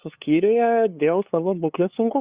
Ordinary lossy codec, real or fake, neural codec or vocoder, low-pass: Opus, 64 kbps; fake; codec, 16 kHz, 2 kbps, FunCodec, trained on LibriTTS, 25 frames a second; 3.6 kHz